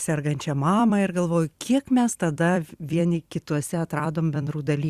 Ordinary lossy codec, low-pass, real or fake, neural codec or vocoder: Opus, 64 kbps; 14.4 kHz; fake; vocoder, 44.1 kHz, 128 mel bands every 256 samples, BigVGAN v2